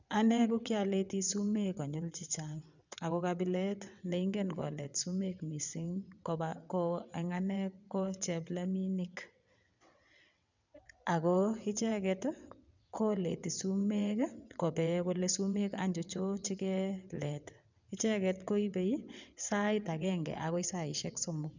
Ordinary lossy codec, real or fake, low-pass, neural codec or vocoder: none; fake; 7.2 kHz; vocoder, 22.05 kHz, 80 mel bands, WaveNeXt